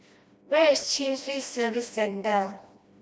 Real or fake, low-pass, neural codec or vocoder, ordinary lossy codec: fake; none; codec, 16 kHz, 1 kbps, FreqCodec, smaller model; none